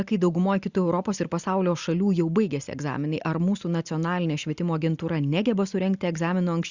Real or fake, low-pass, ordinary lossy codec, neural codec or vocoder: real; 7.2 kHz; Opus, 64 kbps; none